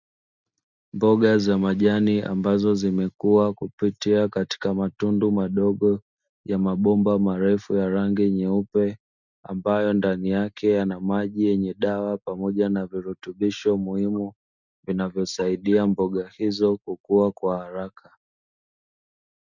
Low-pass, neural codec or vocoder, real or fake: 7.2 kHz; none; real